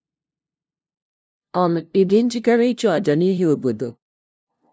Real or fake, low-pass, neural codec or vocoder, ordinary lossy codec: fake; none; codec, 16 kHz, 0.5 kbps, FunCodec, trained on LibriTTS, 25 frames a second; none